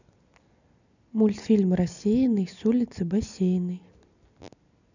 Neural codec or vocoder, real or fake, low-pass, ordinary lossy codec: none; real; 7.2 kHz; none